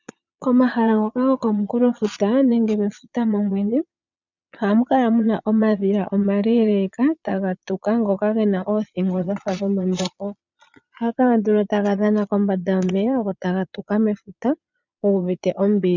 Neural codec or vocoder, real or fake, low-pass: vocoder, 22.05 kHz, 80 mel bands, Vocos; fake; 7.2 kHz